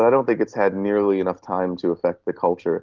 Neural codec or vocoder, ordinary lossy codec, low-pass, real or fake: none; Opus, 16 kbps; 7.2 kHz; real